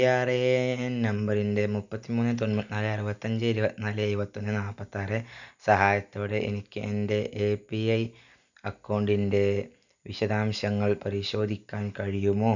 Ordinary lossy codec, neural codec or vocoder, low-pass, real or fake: none; none; 7.2 kHz; real